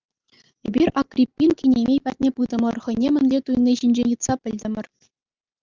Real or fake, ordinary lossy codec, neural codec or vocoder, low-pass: real; Opus, 24 kbps; none; 7.2 kHz